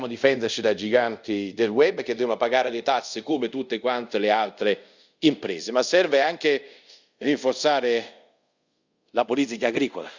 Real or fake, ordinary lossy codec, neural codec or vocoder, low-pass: fake; Opus, 64 kbps; codec, 24 kHz, 0.5 kbps, DualCodec; 7.2 kHz